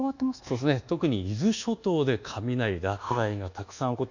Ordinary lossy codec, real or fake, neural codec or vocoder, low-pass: none; fake; codec, 24 kHz, 1.2 kbps, DualCodec; 7.2 kHz